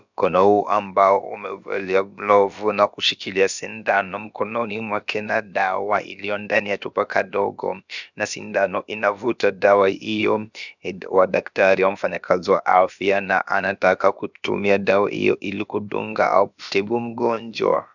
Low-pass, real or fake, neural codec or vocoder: 7.2 kHz; fake; codec, 16 kHz, about 1 kbps, DyCAST, with the encoder's durations